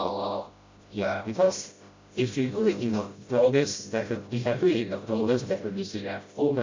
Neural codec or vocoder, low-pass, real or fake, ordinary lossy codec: codec, 16 kHz, 0.5 kbps, FreqCodec, smaller model; 7.2 kHz; fake; MP3, 48 kbps